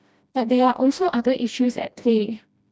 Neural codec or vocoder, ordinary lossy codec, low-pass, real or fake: codec, 16 kHz, 1 kbps, FreqCodec, smaller model; none; none; fake